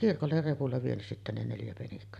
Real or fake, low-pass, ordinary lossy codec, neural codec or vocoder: fake; 14.4 kHz; none; vocoder, 44.1 kHz, 128 mel bands every 256 samples, BigVGAN v2